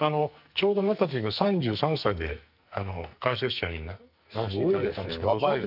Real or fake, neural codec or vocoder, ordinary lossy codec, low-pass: fake; codec, 44.1 kHz, 2.6 kbps, SNAC; none; 5.4 kHz